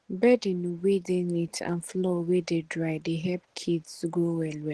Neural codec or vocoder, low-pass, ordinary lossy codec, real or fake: none; 10.8 kHz; Opus, 16 kbps; real